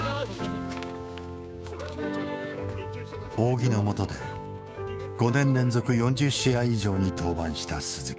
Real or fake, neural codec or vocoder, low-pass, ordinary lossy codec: fake; codec, 16 kHz, 6 kbps, DAC; none; none